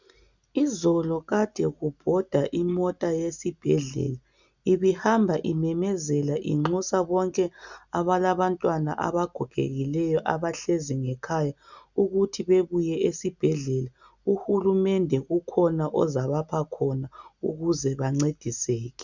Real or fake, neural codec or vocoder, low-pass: real; none; 7.2 kHz